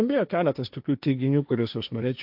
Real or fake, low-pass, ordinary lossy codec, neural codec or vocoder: fake; 5.4 kHz; AAC, 48 kbps; codec, 16 kHz, 1.1 kbps, Voila-Tokenizer